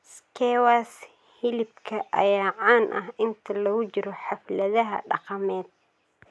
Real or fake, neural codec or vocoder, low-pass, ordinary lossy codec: real; none; none; none